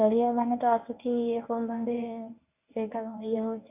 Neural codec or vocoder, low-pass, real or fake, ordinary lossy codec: codec, 24 kHz, 0.9 kbps, WavTokenizer, medium speech release version 1; 3.6 kHz; fake; none